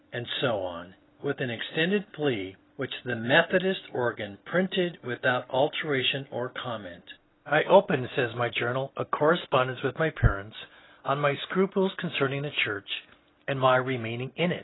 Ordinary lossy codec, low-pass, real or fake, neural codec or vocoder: AAC, 16 kbps; 7.2 kHz; real; none